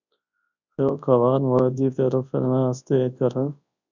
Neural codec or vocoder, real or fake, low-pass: codec, 24 kHz, 0.9 kbps, WavTokenizer, large speech release; fake; 7.2 kHz